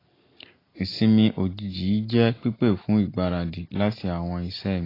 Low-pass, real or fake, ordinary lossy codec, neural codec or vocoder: 5.4 kHz; real; AAC, 24 kbps; none